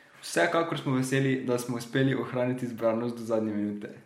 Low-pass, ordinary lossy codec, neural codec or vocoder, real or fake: 19.8 kHz; MP3, 64 kbps; none; real